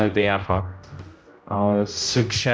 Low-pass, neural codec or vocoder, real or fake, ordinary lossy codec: none; codec, 16 kHz, 0.5 kbps, X-Codec, HuBERT features, trained on general audio; fake; none